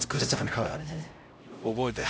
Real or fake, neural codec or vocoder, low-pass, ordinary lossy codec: fake; codec, 16 kHz, 0.5 kbps, X-Codec, HuBERT features, trained on LibriSpeech; none; none